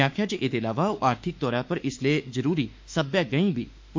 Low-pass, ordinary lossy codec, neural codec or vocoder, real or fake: 7.2 kHz; MP3, 48 kbps; autoencoder, 48 kHz, 32 numbers a frame, DAC-VAE, trained on Japanese speech; fake